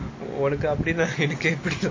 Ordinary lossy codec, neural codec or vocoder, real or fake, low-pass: MP3, 32 kbps; none; real; 7.2 kHz